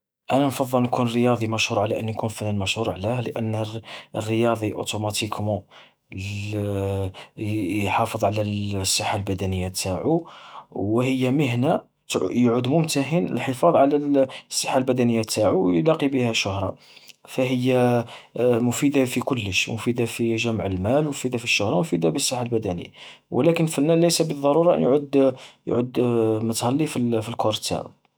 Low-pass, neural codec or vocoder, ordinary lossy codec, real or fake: none; autoencoder, 48 kHz, 128 numbers a frame, DAC-VAE, trained on Japanese speech; none; fake